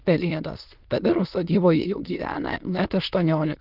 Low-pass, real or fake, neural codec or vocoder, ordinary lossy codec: 5.4 kHz; fake; autoencoder, 22.05 kHz, a latent of 192 numbers a frame, VITS, trained on many speakers; Opus, 32 kbps